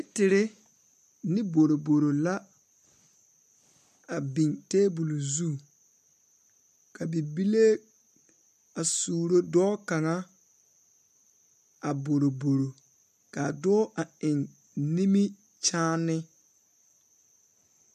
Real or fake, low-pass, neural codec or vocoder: real; 14.4 kHz; none